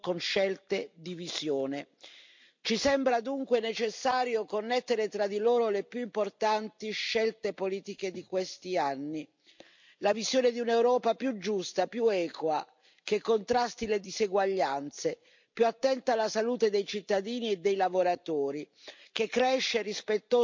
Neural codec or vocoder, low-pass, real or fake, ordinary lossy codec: vocoder, 44.1 kHz, 128 mel bands every 256 samples, BigVGAN v2; 7.2 kHz; fake; MP3, 64 kbps